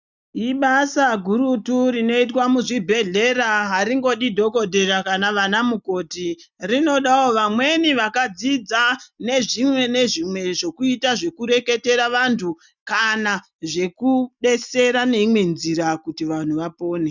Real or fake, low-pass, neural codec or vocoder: real; 7.2 kHz; none